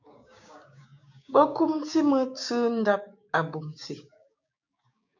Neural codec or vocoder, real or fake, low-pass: codec, 16 kHz, 6 kbps, DAC; fake; 7.2 kHz